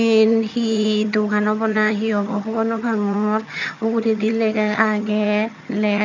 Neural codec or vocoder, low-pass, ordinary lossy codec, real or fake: vocoder, 22.05 kHz, 80 mel bands, HiFi-GAN; 7.2 kHz; none; fake